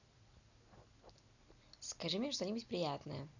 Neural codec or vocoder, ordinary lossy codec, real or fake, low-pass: none; none; real; 7.2 kHz